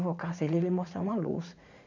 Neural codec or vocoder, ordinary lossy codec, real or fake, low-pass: vocoder, 44.1 kHz, 80 mel bands, Vocos; none; fake; 7.2 kHz